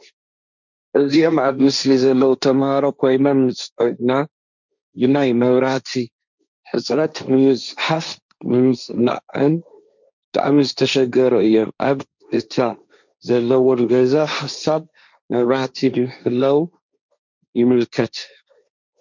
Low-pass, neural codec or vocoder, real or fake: 7.2 kHz; codec, 16 kHz, 1.1 kbps, Voila-Tokenizer; fake